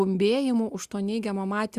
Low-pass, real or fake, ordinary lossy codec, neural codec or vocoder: 14.4 kHz; real; Opus, 64 kbps; none